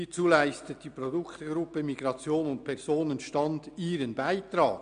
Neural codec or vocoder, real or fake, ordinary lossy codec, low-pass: none; real; none; 9.9 kHz